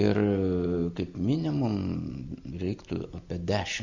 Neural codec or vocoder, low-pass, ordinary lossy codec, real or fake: none; 7.2 kHz; Opus, 64 kbps; real